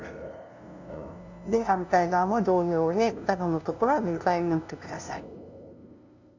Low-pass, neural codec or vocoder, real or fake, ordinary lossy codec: 7.2 kHz; codec, 16 kHz, 0.5 kbps, FunCodec, trained on LibriTTS, 25 frames a second; fake; none